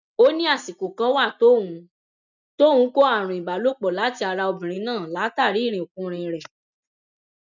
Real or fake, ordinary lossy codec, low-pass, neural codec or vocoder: real; none; 7.2 kHz; none